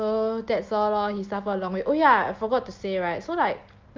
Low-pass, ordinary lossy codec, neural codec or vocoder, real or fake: 7.2 kHz; Opus, 24 kbps; none; real